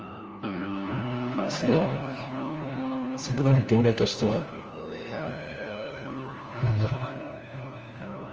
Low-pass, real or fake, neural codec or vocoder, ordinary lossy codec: 7.2 kHz; fake; codec, 16 kHz, 1 kbps, FunCodec, trained on LibriTTS, 50 frames a second; Opus, 24 kbps